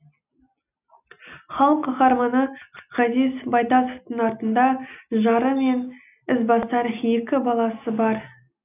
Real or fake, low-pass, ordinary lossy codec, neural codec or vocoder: real; 3.6 kHz; none; none